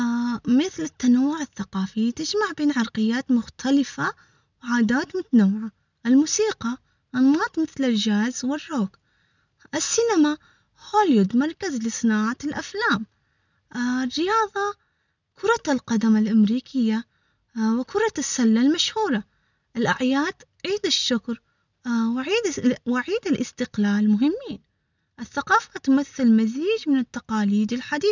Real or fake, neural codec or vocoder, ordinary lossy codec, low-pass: real; none; none; 7.2 kHz